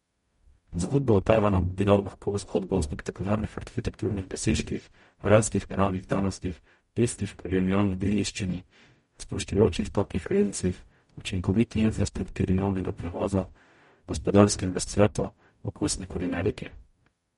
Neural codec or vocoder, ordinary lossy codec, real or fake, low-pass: codec, 44.1 kHz, 0.9 kbps, DAC; MP3, 48 kbps; fake; 19.8 kHz